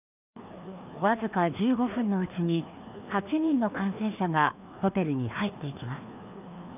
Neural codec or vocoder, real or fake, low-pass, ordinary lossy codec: codec, 16 kHz, 2 kbps, FreqCodec, larger model; fake; 3.6 kHz; none